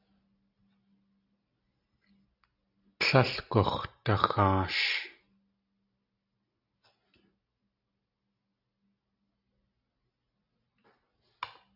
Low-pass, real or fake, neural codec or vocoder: 5.4 kHz; real; none